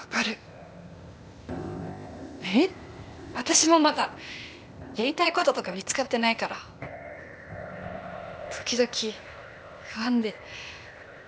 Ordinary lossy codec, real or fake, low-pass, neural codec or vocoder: none; fake; none; codec, 16 kHz, 0.8 kbps, ZipCodec